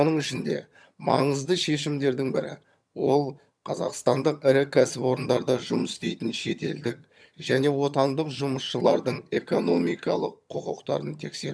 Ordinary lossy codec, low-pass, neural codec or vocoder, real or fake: none; none; vocoder, 22.05 kHz, 80 mel bands, HiFi-GAN; fake